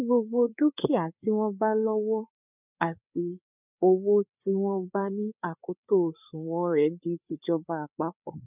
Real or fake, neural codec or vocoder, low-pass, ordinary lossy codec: fake; codec, 16 kHz, 4 kbps, FreqCodec, larger model; 3.6 kHz; none